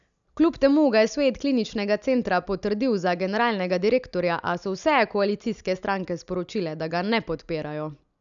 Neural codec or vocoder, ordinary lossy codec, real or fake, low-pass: none; none; real; 7.2 kHz